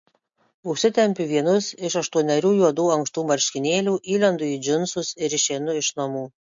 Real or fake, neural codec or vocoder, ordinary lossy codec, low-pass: real; none; MP3, 48 kbps; 7.2 kHz